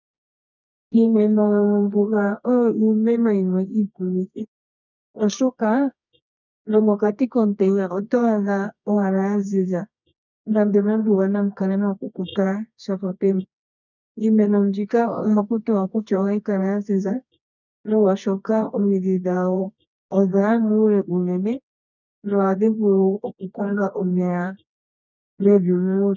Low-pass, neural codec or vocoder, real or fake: 7.2 kHz; codec, 24 kHz, 0.9 kbps, WavTokenizer, medium music audio release; fake